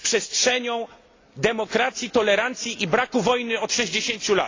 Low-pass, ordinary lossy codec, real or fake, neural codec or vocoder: 7.2 kHz; AAC, 32 kbps; real; none